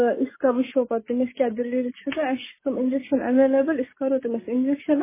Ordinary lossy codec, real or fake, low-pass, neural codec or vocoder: MP3, 16 kbps; real; 3.6 kHz; none